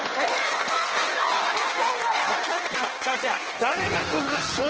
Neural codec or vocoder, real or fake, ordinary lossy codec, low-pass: codec, 16 kHz in and 24 kHz out, 1.1 kbps, FireRedTTS-2 codec; fake; Opus, 16 kbps; 7.2 kHz